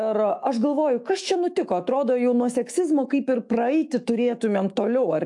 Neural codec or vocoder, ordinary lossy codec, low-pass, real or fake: autoencoder, 48 kHz, 128 numbers a frame, DAC-VAE, trained on Japanese speech; AAC, 64 kbps; 10.8 kHz; fake